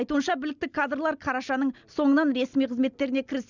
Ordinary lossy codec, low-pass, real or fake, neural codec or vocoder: none; 7.2 kHz; real; none